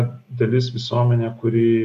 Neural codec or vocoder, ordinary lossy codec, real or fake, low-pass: none; MP3, 64 kbps; real; 14.4 kHz